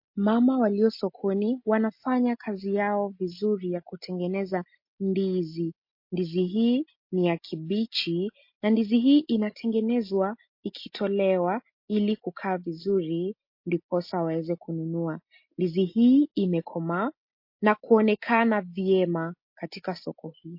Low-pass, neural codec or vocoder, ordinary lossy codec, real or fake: 5.4 kHz; none; MP3, 32 kbps; real